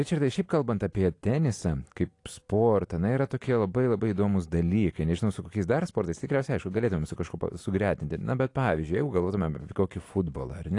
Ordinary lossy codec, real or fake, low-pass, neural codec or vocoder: AAC, 48 kbps; real; 10.8 kHz; none